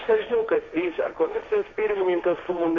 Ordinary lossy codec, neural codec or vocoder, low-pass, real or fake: MP3, 48 kbps; codec, 16 kHz, 1.1 kbps, Voila-Tokenizer; 7.2 kHz; fake